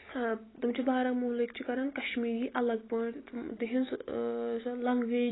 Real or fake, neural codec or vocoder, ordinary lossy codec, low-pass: real; none; AAC, 16 kbps; 7.2 kHz